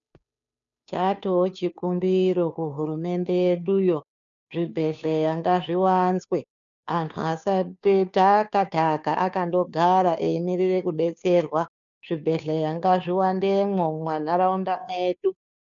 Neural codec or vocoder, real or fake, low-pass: codec, 16 kHz, 2 kbps, FunCodec, trained on Chinese and English, 25 frames a second; fake; 7.2 kHz